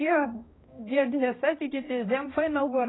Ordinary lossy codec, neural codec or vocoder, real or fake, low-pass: AAC, 16 kbps; codec, 16 kHz, 1 kbps, X-Codec, HuBERT features, trained on balanced general audio; fake; 7.2 kHz